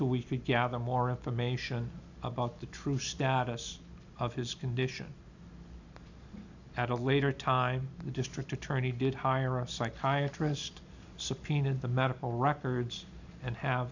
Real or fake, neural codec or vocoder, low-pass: real; none; 7.2 kHz